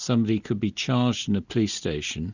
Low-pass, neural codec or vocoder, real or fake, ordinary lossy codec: 7.2 kHz; none; real; Opus, 64 kbps